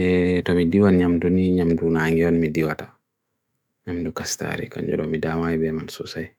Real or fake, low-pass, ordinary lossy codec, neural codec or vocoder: fake; 19.8 kHz; none; autoencoder, 48 kHz, 128 numbers a frame, DAC-VAE, trained on Japanese speech